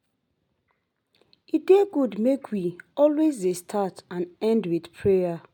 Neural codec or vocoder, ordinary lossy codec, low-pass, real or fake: none; MP3, 96 kbps; 19.8 kHz; real